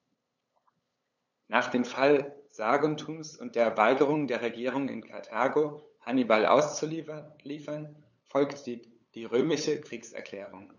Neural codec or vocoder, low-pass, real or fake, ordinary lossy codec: codec, 16 kHz, 8 kbps, FunCodec, trained on LibriTTS, 25 frames a second; 7.2 kHz; fake; none